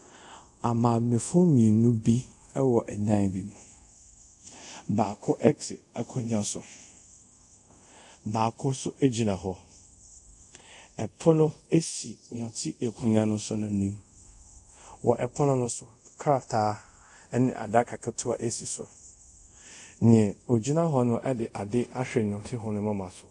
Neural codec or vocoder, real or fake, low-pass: codec, 24 kHz, 0.5 kbps, DualCodec; fake; 10.8 kHz